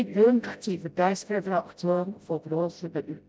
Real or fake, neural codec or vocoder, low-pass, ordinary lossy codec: fake; codec, 16 kHz, 0.5 kbps, FreqCodec, smaller model; none; none